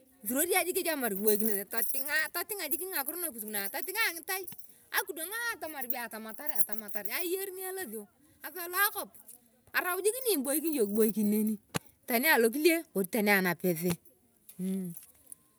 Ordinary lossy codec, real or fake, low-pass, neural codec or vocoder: none; real; none; none